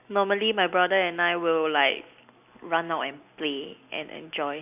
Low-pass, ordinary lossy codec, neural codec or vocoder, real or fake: 3.6 kHz; none; none; real